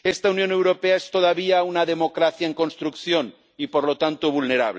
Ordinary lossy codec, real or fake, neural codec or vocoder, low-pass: none; real; none; none